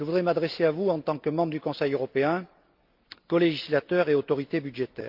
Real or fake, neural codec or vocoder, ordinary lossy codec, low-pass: real; none; Opus, 24 kbps; 5.4 kHz